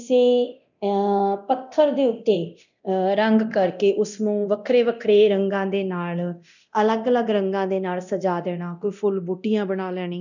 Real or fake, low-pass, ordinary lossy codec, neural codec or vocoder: fake; 7.2 kHz; none; codec, 24 kHz, 0.9 kbps, DualCodec